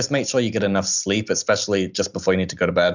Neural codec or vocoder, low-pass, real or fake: none; 7.2 kHz; real